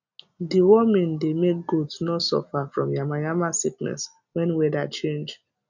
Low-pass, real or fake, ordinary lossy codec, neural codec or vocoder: 7.2 kHz; real; none; none